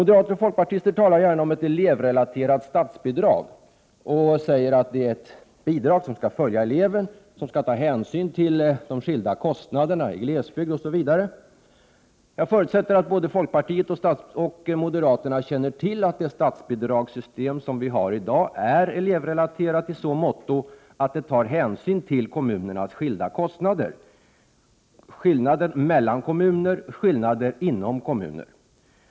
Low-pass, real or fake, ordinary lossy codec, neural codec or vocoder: none; real; none; none